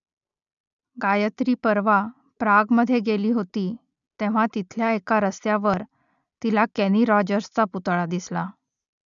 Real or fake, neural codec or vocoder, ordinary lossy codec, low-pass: real; none; none; 7.2 kHz